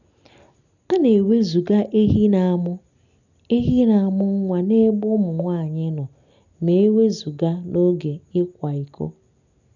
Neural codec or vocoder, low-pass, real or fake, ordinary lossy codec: none; 7.2 kHz; real; none